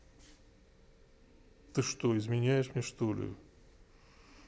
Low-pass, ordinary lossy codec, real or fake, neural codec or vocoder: none; none; real; none